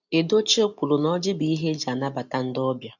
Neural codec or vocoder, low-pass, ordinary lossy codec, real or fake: none; 7.2 kHz; none; real